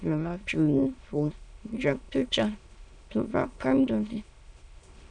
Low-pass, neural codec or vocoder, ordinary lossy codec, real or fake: 9.9 kHz; autoencoder, 22.05 kHz, a latent of 192 numbers a frame, VITS, trained on many speakers; MP3, 96 kbps; fake